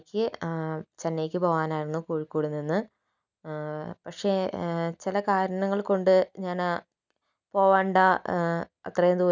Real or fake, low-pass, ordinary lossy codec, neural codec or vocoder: real; 7.2 kHz; none; none